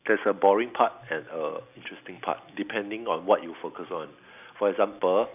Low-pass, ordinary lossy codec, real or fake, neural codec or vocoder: 3.6 kHz; none; real; none